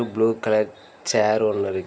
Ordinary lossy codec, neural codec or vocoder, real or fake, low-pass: none; none; real; none